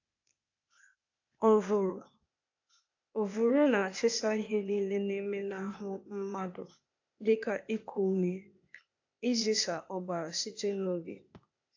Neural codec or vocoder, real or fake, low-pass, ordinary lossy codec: codec, 16 kHz, 0.8 kbps, ZipCodec; fake; 7.2 kHz; AAC, 48 kbps